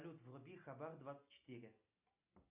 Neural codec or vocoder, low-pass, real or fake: none; 3.6 kHz; real